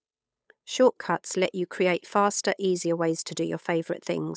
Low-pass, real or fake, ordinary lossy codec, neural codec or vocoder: none; fake; none; codec, 16 kHz, 8 kbps, FunCodec, trained on Chinese and English, 25 frames a second